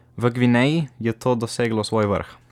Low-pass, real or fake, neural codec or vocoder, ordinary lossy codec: 19.8 kHz; real; none; none